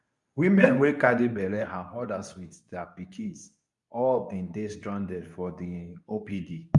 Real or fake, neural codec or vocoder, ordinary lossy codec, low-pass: fake; codec, 24 kHz, 0.9 kbps, WavTokenizer, medium speech release version 2; MP3, 96 kbps; 10.8 kHz